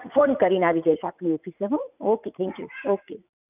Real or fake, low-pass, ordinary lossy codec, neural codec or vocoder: fake; 3.6 kHz; none; vocoder, 44.1 kHz, 80 mel bands, Vocos